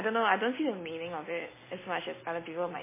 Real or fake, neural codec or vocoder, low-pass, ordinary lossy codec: real; none; 3.6 kHz; MP3, 16 kbps